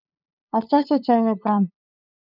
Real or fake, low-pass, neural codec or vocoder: fake; 5.4 kHz; codec, 16 kHz, 2 kbps, FunCodec, trained on LibriTTS, 25 frames a second